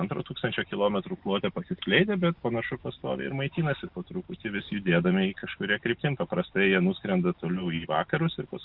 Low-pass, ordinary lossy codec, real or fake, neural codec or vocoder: 5.4 kHz; Opus, 64 kbps; real; none